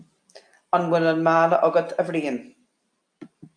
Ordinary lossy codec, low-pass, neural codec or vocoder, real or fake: AAC, 64 kbps; 9.9 kHz; none; real